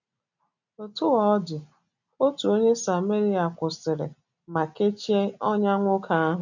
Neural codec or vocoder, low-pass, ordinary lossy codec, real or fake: none; 7.2 kHz; none; real